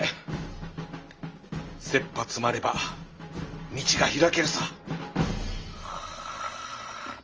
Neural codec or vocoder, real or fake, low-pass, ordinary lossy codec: none; real; 7.2 kHz; Opus, 24 kbps